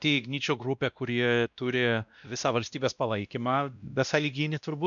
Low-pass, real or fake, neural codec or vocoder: 7.2 kHz; fake; codec, 16 kHz, 1 kbps, X-Codec, WavLM features, trained on Multilingual LibriSpeech